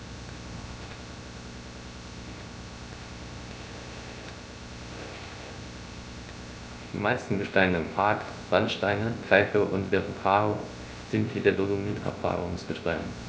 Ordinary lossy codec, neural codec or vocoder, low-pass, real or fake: none; codec, 16 kHz, 0.3 kbps, FocalCodec; none; fake